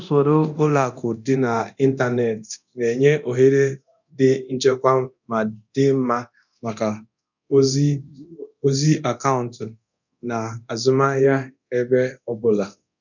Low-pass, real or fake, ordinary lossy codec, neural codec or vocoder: 7.2 kHz; fake; none; codec, 24 kHz, 0.9 kbps, DualCodec